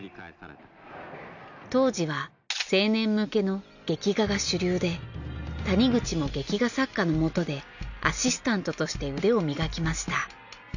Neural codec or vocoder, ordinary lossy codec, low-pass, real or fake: none; none; 7.2 kHz; real